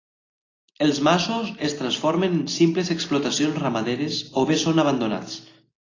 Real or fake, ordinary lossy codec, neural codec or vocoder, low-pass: real; AAC, 32 kbps; none; 7.2 kHz